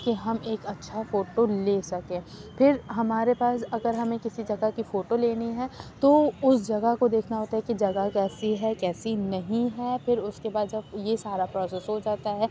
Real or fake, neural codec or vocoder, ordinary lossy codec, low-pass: real; none; none; none